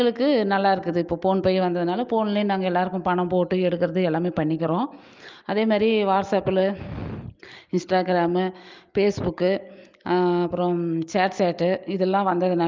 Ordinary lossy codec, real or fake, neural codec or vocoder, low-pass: Opus, 24 kbps; real; none; 7.2 kHz